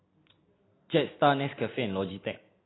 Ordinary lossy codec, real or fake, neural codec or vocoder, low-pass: AAC, 16 kbps; real; none; 7.2 kHz